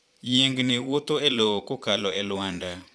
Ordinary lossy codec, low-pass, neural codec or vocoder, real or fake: none; none; vocoder, 22.05 kHz, 80 mel bands, Vocos; fake